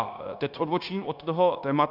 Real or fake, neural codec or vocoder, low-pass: fake; codec, 16 kHz, 0.9 kbps, LongCat-Audio-Codec; 5.4 kHz